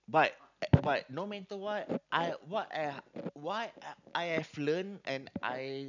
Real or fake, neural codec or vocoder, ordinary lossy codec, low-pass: real; none; none; 7.2 kHz